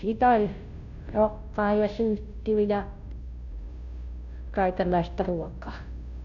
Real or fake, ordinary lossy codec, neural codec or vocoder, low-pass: fake; none; codec, 16 kHz, 0.5 kbps, FunCodec, trained on Chinese and English, 25 frames a second; 7.2 kHz